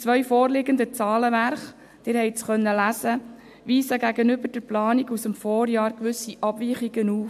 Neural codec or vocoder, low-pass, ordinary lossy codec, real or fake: none; 14.4 kHz; AAC, 96 kbps; real